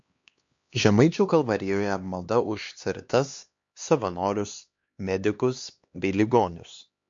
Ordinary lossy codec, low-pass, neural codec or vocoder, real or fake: MP3, 48 kbps; 7.2 kHz; codec, 16 kHz, 2 kbps, X-Codec, HuBERT features, trained on LibriSpeech; fake